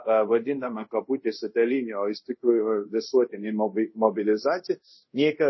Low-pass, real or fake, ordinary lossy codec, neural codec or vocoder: 7.2 kHz; fake; MP3, 24 kbps; codec, 24 kHz, 0.5 kbps, DualCodec